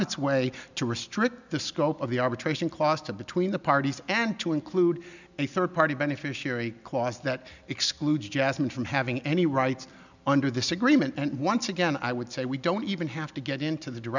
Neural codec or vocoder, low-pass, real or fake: none; 7.2 kHz; real